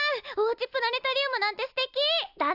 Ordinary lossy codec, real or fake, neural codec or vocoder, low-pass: AAC, 48 kbps; real; none; 5.4 kHz